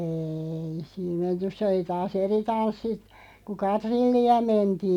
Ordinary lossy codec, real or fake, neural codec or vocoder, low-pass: none; real; none; 19.8 kHz